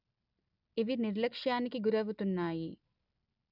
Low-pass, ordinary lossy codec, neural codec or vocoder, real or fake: 5.4 kHz; none; none; real